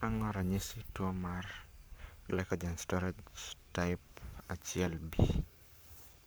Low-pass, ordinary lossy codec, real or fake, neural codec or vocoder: none; none; fake; codec, 44.1 kHz, 7.8 kbps, Pupu-Codec